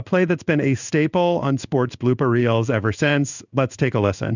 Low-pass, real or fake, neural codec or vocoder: 7.2 kHz; fake; codec, 16 kHz in and 24 kHz out, 1 kbps, XY-Tokenizer